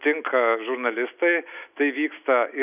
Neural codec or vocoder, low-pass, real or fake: none; 3.6 kHz; real